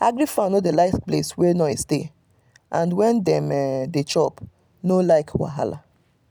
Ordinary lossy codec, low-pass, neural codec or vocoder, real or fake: none; none; none; real